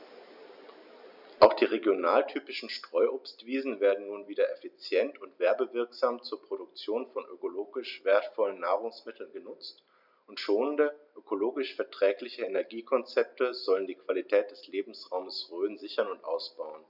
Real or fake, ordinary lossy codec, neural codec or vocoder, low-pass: real; none; none; 5.4 kHz